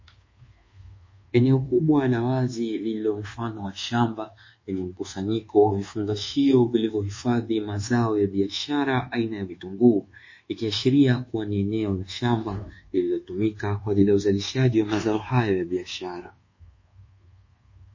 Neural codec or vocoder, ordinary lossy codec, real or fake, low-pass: codec, 24 kHz, 1.2 kbps, DualCodec; MP3, 32 kbps; fake; 7.2 kHz